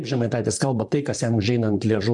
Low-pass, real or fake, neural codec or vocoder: 10.8 kHz; fake; vocoder, 44.1 kHz, 128 mel bands, Pupu-Vocoder